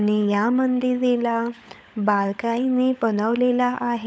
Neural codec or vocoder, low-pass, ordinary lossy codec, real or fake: codec, 16 kHz, 16 kbps, FunCodec, trained on LibriTTS, 50 frames a second; none; none; fake